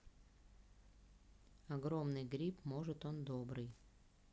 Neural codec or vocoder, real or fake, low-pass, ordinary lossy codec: none; real; none; none